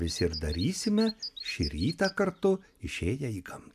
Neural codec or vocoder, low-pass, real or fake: none; 14.4 kHz; real